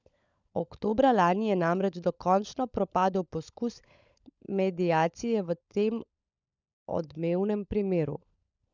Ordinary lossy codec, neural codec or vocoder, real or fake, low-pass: none; codec, 16 kHz, 16 kbps, FunCodec, trained on LibriTTS, 50 frames a second; fake; 7.2 kHz